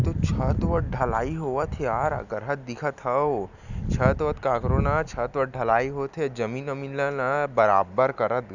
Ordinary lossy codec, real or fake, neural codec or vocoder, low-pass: none; real; none; 7.2 kHz